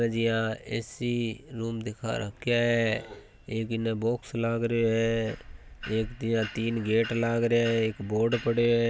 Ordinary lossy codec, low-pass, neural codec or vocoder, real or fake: none; none; none; real